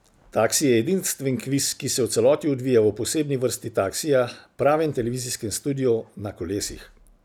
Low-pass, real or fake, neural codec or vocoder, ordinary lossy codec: none; real; none; none